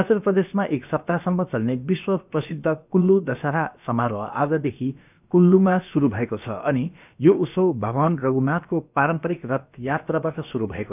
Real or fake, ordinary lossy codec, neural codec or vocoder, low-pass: fake; none; codec, 16 kHz, about 1 kbps, DyCAST, with the encoder's durations; 3.6 kHz